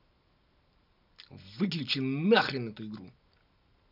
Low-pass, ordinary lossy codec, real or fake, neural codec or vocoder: 5.4 kHz; none; real; none